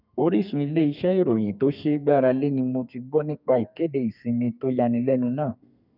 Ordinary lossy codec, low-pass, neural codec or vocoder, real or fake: none; 5.4 kHz; codec, 32 kHz, 1.9 kbps, SNAC; fake